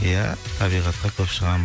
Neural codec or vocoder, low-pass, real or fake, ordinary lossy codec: none; none; real; none